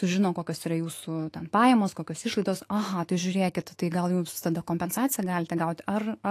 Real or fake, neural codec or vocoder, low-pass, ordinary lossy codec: fake; autoencoder, 48 kHz, 128 numbers a frame, DAC-VAE, trained on Japanese speech; 14.4 kHz; AAC, 48 kbps